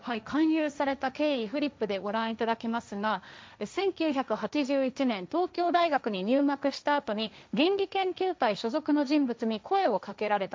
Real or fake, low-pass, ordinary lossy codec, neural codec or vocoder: fake; none; none; codec, 16 kHz, 1.1 kbps, Voila-Tokenizer